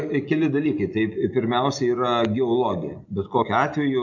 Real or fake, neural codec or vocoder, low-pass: real; none; 7.2 kHz